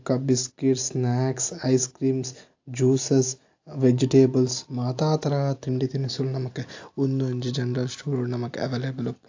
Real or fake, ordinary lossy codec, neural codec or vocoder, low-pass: real; AAC, 48 kbps; none; 7.2 kHz